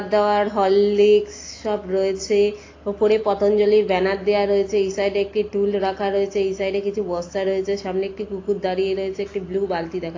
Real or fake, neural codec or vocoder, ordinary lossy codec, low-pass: real; none; AAC, 32 kbps; 7.2 kHz